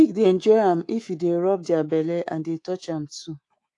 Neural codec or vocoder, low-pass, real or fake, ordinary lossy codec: codec, 24 kHz, 3.1 kbps, DualCodec; 10.8 kHz; fake; AAC, 48 kbps